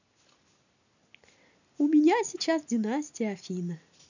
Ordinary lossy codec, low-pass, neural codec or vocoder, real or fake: none; 7.2 kHz; none; real